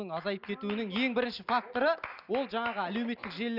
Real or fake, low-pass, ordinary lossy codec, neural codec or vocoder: real; 5.4 kHz; none; none